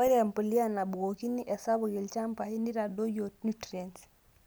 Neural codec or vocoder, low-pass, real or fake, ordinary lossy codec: none; none; real; none